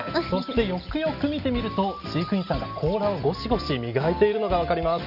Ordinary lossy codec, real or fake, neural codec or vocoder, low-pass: none; real; none; 5.4 kHz